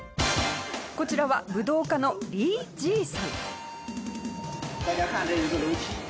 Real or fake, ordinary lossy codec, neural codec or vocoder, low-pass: real; none; none; none